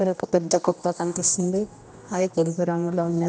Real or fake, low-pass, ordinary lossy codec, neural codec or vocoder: fake; none; none; codec, 16 kHz, 1 kbps, X-Codec, HuBERT features, trained on general audio